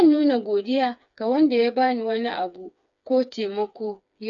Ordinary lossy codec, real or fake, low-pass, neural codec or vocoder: none; fake; 7.2 kHz; codec, 16 kHz, 4 kbps, FreqCodec, smaller model